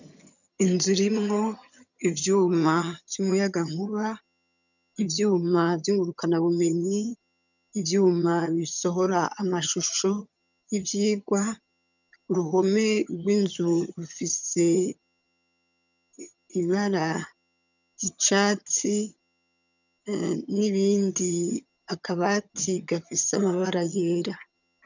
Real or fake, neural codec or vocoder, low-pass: fake; vocoder, 22.05 kHz, 80 mel bands, HiFi-GAN; 7.2 kHz